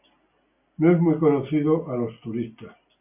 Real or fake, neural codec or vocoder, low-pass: real; none; 3.6 kHz